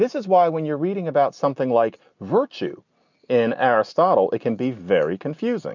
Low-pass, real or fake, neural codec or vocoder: 7.2 kHz; real; none